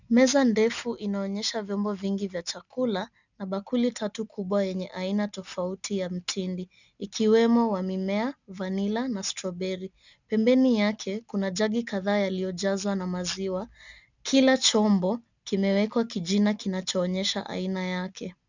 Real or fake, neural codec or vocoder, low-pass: real; none; 7.2 kHz